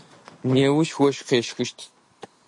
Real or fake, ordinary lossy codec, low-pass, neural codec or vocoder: real; MP3, 48 kbps; 10.8 kHz; none